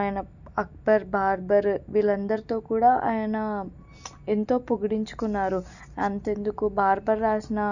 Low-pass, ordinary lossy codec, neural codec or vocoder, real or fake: 7.2 kHz; none; none; real